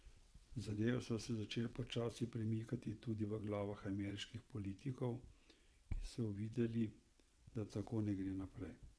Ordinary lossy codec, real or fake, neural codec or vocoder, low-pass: none; fake; vocoder, 22.05 kHz, 80 mel bands, WaveNeXt; none